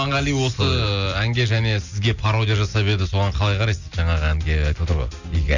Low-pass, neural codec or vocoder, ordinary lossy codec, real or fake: 7.2 kHz; none; none; real